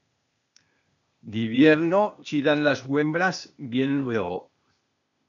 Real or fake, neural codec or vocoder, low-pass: fake; codec, 16 kHz, 0.8 kbps, ZipCodec; 7.2 kHz